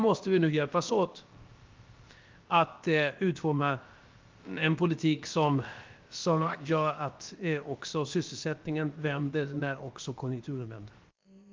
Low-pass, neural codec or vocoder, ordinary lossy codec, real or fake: 7.2 kHz; codec, 16 kHz, about 1 kbps, DyCAST, with the encoder's durations; Opus, 32 kbps; fake